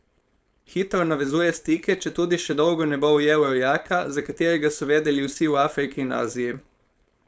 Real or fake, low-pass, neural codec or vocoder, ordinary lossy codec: fake; none; codec, 16 kHz, 4.8 kbps, FACodec; none